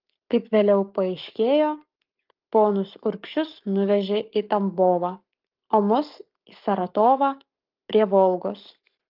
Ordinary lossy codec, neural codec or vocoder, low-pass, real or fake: Opus, 32 kbps; codec, 44.1 kHz, 7.8 kbps, Pupu-Codec; 5.4 kHz; fake